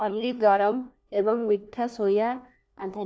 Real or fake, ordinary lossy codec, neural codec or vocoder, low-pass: fake; none; codec, 16 kHz, 1 kbps, FunCodec, trained on LibriTTS, 50 frames a second; none